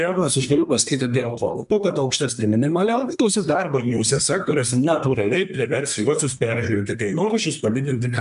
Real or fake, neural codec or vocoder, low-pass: fake; codec, 24 kHz, 1 kbps, SNAC; 10.8 kHz